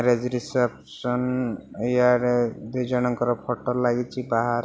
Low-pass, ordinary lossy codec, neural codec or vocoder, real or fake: none; none; none; real